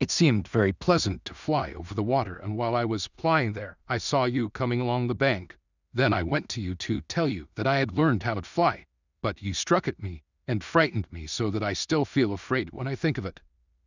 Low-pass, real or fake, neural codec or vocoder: 7.2 kHz; fake; codec, 16 kHz in and 24 kHz out, 0.4 kbps, LongCat-Audio-Codec, two codebook decoder